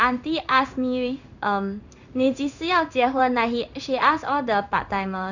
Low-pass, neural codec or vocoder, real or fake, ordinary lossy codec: 7.2 kHz; codec, 16 kHz in and 24 kHz out, 1 kbps, XY-Tokenizer; fake; none